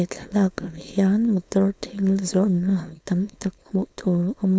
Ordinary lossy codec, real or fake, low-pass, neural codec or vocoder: none; fake; none; codec, 16 kHz, 4.8 kbps, FACodec